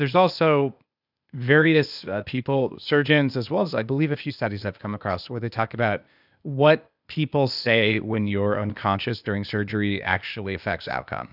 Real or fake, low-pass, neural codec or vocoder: fake; 5.4 kHz; codec, 16 kHz, 0.8 kbps, ZipCodec